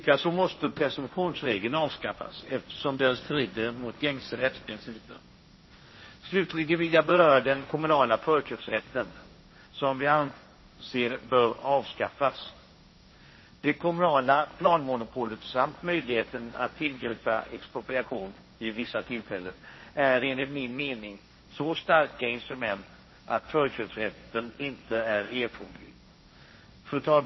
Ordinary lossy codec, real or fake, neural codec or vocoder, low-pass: MP3, 24 kbps; fake; codec, 16 kHz, 1.1 kbps, Voila-Tokenizer; 7.2 kHz